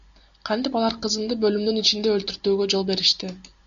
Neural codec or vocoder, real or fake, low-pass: none; real; 7.2 kHz